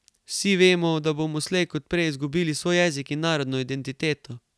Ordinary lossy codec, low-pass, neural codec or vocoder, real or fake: none; none; none; real